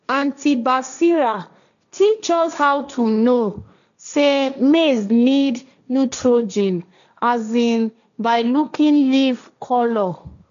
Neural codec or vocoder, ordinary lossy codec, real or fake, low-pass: codec, 16 kHz, 1.1 kbps, Voila-Tokenizer; none; fake; 7.2 kHz